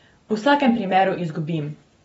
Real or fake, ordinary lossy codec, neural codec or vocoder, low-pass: real; AAC, 24 kbps; none; 10.8 kHz